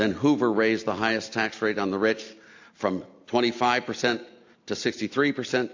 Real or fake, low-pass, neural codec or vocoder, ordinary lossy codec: real; 7.2 kHz; none; AAC, 48 kbps